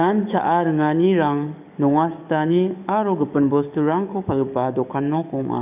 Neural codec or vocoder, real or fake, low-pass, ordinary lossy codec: codec, 44.1 kHz, 7.8 kbps, DAC; fake; 3.6 kHz; none